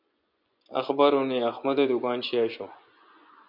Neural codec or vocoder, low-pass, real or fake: vocoder, 24 kHz, 100 mel bands, Vocos; 5.4 kHz; fake